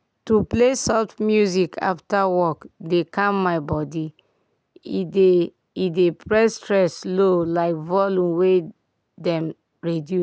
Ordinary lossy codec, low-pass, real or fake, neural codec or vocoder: none; none; real; none